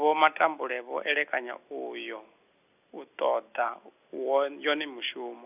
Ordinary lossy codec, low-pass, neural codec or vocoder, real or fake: none; 3.6 kHz; none; real